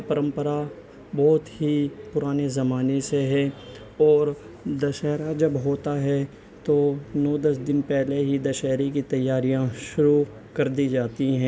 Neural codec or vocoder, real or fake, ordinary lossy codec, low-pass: none; real; none; none